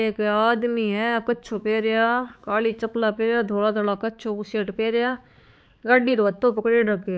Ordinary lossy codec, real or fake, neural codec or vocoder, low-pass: none; fake; codec, 16 kHz, 4 kbps, X-Codec, HuBERT features, trained on balanced general audio; none